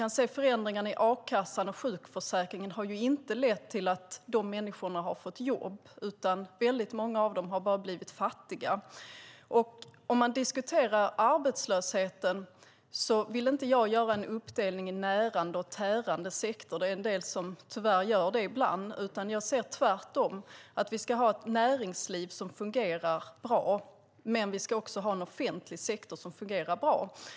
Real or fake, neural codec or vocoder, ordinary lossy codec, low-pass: real; none; none; none